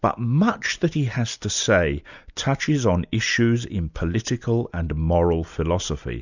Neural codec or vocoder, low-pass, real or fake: none; 7.2 kHz; real